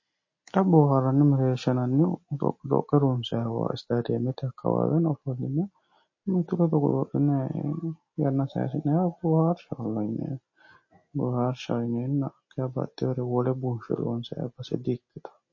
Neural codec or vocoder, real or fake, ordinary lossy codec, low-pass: none; real; MP3, 32 kbps; 7.2 kHz